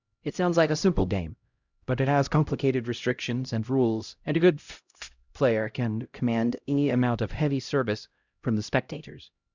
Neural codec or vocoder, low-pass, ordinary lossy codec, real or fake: codec, 16 kHz, 0.5 kbps, X-Codec, HuBERT features, trained on LibriSpeech; 7.2 kHz; Opus, 64 kbps; fake